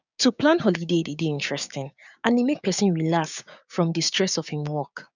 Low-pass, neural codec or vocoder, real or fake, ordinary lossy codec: 7.2 kHz; codec, 16 kHz, 6 kbps, DAC; fake; none